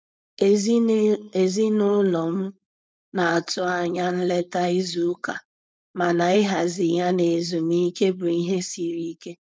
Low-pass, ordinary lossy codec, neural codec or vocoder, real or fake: none; none; codec, 16 kHz, 4.8 kbps, FACodec; fake